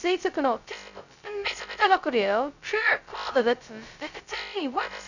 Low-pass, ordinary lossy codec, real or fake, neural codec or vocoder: 7.2 kHz; none; fake; codec, 16 kHz, 0.2 kbps, FocalCodec